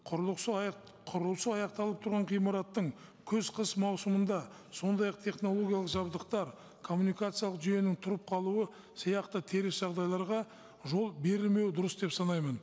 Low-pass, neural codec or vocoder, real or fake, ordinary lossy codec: none; none; real; none